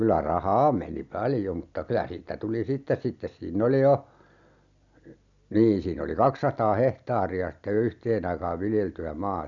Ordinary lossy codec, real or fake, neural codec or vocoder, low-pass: none; real; none; 7.2 kHz